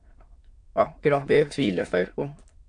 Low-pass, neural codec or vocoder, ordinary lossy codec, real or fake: 9.9 kHz; autoencoder, 22.05 kHz, a latent of 192 numbers a frame, VITS, trained on many speakers; AAC, 48 kbps; fake